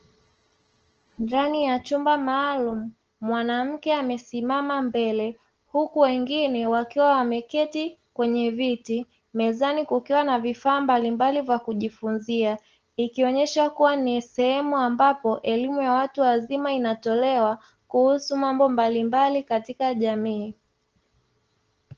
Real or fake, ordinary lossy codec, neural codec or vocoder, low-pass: real; Opus, 16 kbps; none; 7.2 kHz